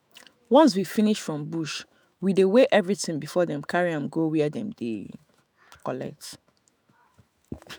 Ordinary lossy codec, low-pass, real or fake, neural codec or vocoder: none; none; fake; autoencoder, 48 kHz, 128 numbers a frame, DAC-VAE, trained on Japanese speech